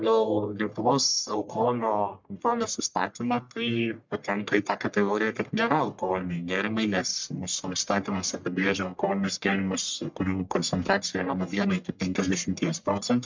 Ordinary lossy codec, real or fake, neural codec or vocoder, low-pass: MP3, 64 kbps; fake; codec, 44.1 kHz, 1.7 kbps, Pupu-Codec; 7.2 kHz